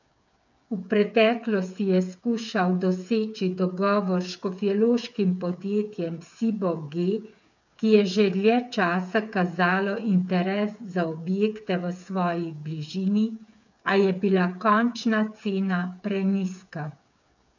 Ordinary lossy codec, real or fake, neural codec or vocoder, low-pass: none; fake; codec, 16 kHz, 8 kbps, FreqCodec, smaller model; 7.2 kHz